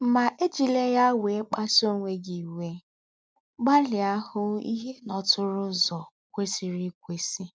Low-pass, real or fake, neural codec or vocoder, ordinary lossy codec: none; real; none; none